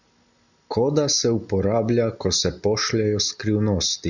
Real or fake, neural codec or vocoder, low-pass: real; none; 7.2 kHz